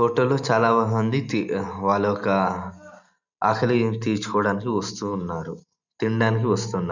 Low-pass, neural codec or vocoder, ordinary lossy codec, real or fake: 7.2 kHz; none; none; real